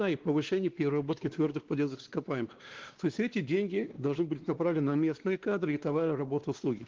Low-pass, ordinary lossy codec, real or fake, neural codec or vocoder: 7.2 kHz; Opus, 16 kbps; fake; codec, 16 kHz, 2 kbps, X-Codec, WavLM features, trained on Multilingual LibriSpeech